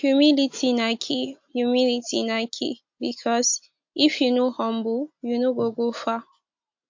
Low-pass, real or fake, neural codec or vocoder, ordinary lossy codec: 7.2 kHz; real; none; MP3, 48 kbps